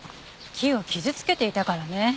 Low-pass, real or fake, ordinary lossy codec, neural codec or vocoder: none; real; none; none